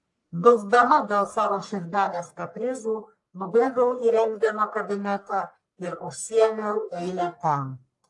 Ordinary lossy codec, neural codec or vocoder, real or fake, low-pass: AAC, 64 kbps; codec, 44.1 kHz, 1.7 kbps, Pupu-Codec; fake; 10.8 kHz